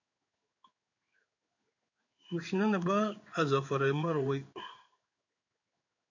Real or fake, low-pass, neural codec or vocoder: fake; 7.2 kHz; codec, 16 kHz in and 24 kHz out, 1 kbps, XY-Tokenizer